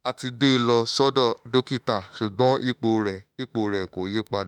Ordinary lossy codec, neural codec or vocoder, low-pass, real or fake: none; autoencoder, 48 kHz, 32 numbers a frame, DAC-VAE, trained on Japanese speech; 19.8 kHz; fake